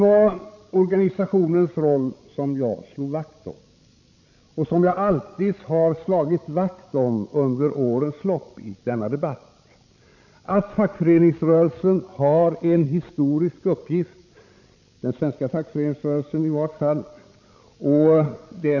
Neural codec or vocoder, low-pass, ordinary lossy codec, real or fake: codec, 16 kHz, 16 kbps, FreqCodec, larger model; 7.2 kHz; none; fake